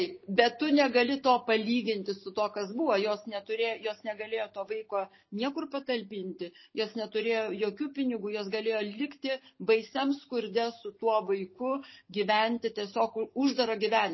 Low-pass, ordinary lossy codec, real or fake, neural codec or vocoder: 7.2 kHz; MP3, 24 kbps; real; none